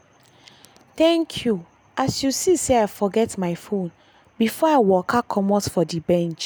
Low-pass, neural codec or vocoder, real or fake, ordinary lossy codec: none; none; real; none